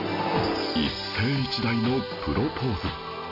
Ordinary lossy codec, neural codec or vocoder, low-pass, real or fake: none; none; 5.4 kHz; real